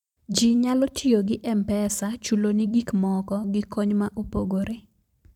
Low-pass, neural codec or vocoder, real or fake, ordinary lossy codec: 19.8 kHz; vocoder, 44.1 kHz, 128 mel bands every 512 samples, BigVGAN v2; fake; none